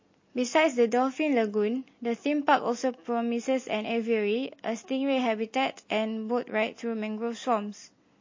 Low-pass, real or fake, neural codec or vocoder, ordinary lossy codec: 7.2 kHz; real; none; MP3, 32 kbps